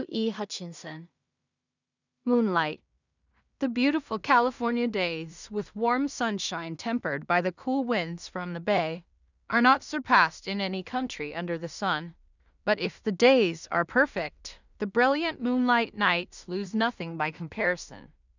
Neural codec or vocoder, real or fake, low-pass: codec, 16 kHz in and 24 kHz out, 0.4 kbps, LongCat-Audio-Codec, two codebook decoder; fake; 7.2 kHz